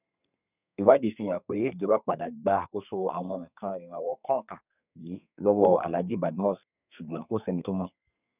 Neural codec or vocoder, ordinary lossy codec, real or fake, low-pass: codec, 32 kHz, 1.9 kbps, SNAC; none; fake; 3.6 kHz